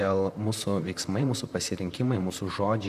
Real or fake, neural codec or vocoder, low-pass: fake; vocoder, 44.1 kHz, 128 mel bands, Pupu-Vocoder; 14.4 kHz